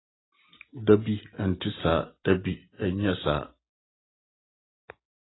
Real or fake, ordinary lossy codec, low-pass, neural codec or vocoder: real; AAC, 16 kbps; 7.2 kHz; none